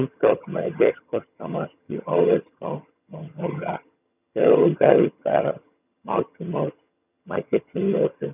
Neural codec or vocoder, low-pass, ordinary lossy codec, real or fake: vocoder, 22.05 kHz, 80 mel bands, HiFi-GAN; 3.6 kHz; none; fake